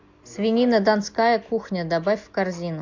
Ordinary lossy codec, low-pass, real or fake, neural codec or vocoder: MP3, 64 kbps; 7.2 kHz; real; none